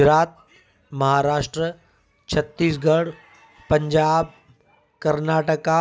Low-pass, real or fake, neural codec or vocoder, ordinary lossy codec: none; real; none; none